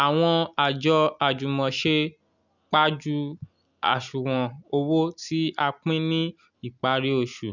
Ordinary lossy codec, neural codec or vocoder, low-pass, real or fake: none; none; 7.2 kHz; real